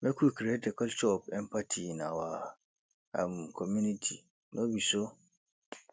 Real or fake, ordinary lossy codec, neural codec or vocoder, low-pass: real; none; none; none